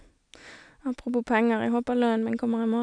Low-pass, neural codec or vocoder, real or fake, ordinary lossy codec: 9.9 kHz; none; real; none